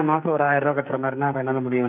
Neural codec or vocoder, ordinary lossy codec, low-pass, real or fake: codec, 44.1 kHz, 2.6 kbps, SNAC; none; 3.6 kHz; fake